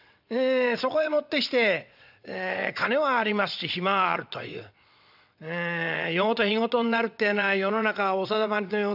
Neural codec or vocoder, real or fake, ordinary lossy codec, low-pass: vocoder, 22.05 kHz, 80 mel bands, WaveNeXt; fake; none; 5.4 kHz